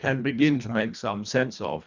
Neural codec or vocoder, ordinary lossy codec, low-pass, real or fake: codec, 24 kHz, 1.5 kbps, HILCodec; Opus, 64 kbps; 7.2 kHz; fake